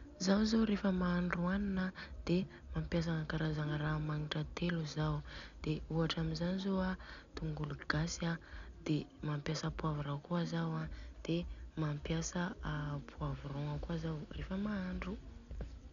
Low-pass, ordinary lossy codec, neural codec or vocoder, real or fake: 7.2 kHz; MP3, 96 kbps; none; real